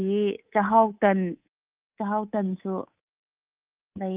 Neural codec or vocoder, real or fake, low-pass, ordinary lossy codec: codec, 24 kHz, 3.1 kbps, DualCodec; fake; 3.6 kHz; Opus, 24 kbps